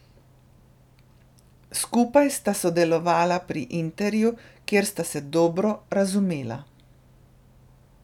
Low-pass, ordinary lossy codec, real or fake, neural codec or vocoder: 19.8 kHz; none; real; none